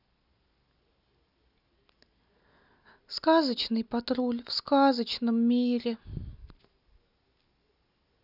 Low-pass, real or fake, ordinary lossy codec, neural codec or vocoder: 5.4 kHz; real; none; none